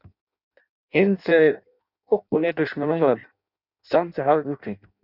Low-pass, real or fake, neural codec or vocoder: 5.4 kHz; fake; codec, 16 kHz in and 24 kHz out, 0.6 kbps, FireRedTTS-2 codec